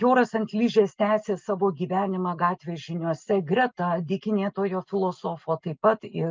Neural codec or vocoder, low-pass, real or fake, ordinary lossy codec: none; 7.2 kHz; real; Opus, 24 kbps